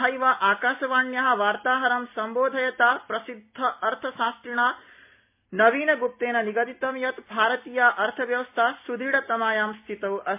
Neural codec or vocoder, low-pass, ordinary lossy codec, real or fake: none; 3.6 kHz; MP3, 32 kbps; real